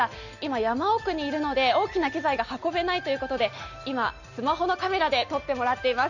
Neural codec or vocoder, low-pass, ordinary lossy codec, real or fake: none; 7.2 kHz; Opus, 64 kbps; real